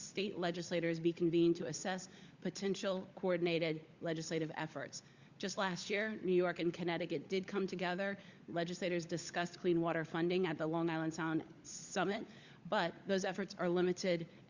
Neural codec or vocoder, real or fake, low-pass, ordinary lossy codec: codec, 16 kHz, 16 kbps, FunCodec, trained on LibriTTS, 50 frames a second; fake; 7.2 kHz; Opus, 64 kbps